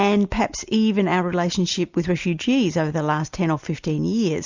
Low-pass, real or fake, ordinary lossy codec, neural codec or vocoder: 7.2 kHz; real; Opus, 64 kbps; none